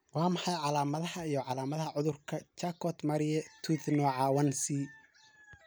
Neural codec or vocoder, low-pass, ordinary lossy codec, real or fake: none; none; none; real